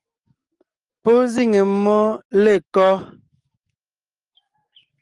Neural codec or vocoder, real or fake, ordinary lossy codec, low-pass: codec, 44.1 kHz, 7.8 kbps, DAC; fake; Opus, 32 kbps; 10.8 kHz